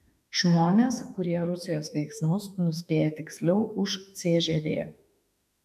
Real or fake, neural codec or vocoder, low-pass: fake; autoencoder, 48 kHz, 32 numbers a frame, DAC-VAE, trained on Japanese speech; 14.4 kHz